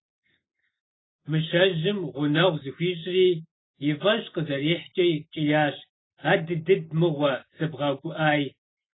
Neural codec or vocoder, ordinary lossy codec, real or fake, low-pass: none; AAC, 16 kbps; real; 7.2 kHz